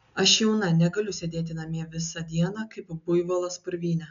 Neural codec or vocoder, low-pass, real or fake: none; 7.2 kHz; real